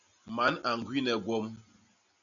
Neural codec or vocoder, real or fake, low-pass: none; real; 7.2 kHz